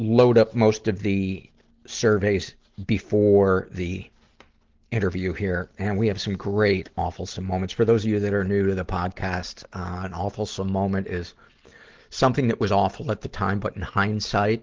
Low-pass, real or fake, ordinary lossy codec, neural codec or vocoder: 7.2 kHz; real; Opus, 16 kbps; none